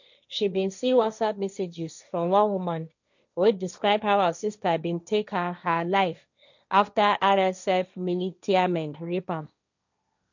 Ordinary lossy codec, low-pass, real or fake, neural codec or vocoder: none; 7.2 kHz; fake; codec, 16 kHz, 1.1 kbps, Voila-Tokenizer